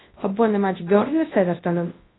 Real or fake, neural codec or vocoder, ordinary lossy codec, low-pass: fake; codec, 24 kHz, 0.9 kbps, WavTokenizer, large speech release; AAC, 16 kbps; 7.2 kHz